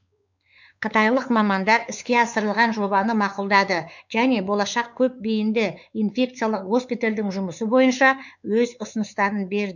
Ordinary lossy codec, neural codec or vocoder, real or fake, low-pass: none; codec, 16 kHz, 4 kbps, X-Codec, WavLM features, trained on Multilingual LibriSpeech; fake; 7.2 kHz